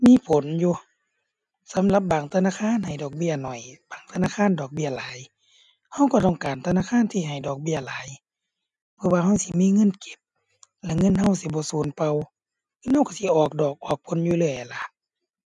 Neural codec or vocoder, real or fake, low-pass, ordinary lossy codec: none; real; 10.8 kHz; none